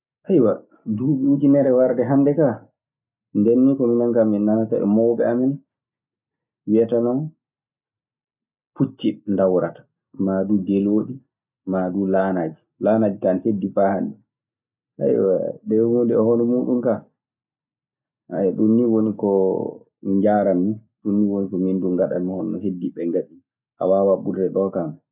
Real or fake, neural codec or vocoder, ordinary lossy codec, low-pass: real; none; none; 3.6 kHz